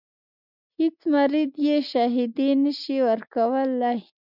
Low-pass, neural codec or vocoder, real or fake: 5.4 kHz; none; real